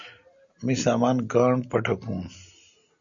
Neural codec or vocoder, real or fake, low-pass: none; real; 7.2 kHz